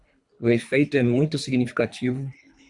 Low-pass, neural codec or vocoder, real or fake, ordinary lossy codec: 10.8 kHz; codec, 24 kHz, 3 kbps, HILCodec; fake; Opus, 64 kbps